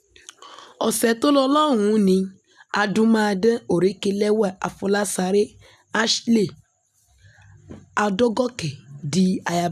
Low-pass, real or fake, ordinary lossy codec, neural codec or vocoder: 14.4 kHz; real; none; none